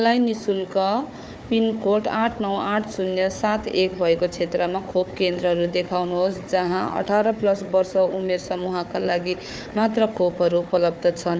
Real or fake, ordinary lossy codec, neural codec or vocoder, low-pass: fake; none; codec, 16 kHz, 4 kbps, FunCodec, trained on Chinese and English, 50 frames a second; none